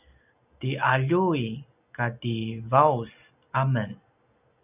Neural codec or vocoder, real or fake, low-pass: none; real; 3.6 kHz